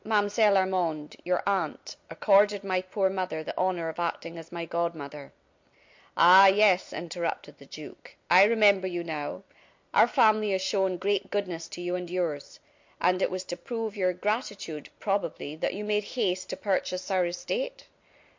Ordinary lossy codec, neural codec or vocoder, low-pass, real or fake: MP3, 48 kbps; none; 7.2 kHz; real